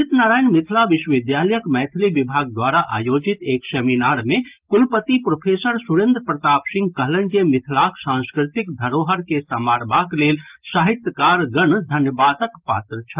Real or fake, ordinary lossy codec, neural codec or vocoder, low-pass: real; Opus, 32 kbps; none; 3.6 kHz